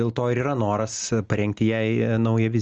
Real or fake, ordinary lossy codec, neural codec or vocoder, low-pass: real; Opus, 24 kbps; none; 7.2 kHz